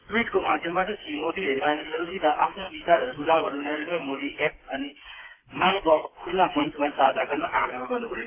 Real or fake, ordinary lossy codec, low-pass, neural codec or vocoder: fake; AAC, 24 kbps; 3.6 kHz; codec, 16 kHz, 4 kbps, FreqCodec, smaller model